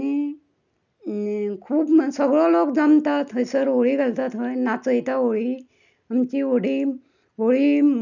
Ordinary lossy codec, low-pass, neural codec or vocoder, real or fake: none; 7.2 kHz; none; real